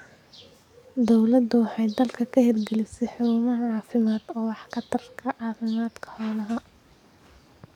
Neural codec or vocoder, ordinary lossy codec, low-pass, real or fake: codec, 44.1 kHz, 7.8 kbps, DAC; none; 19.8 kHz; fake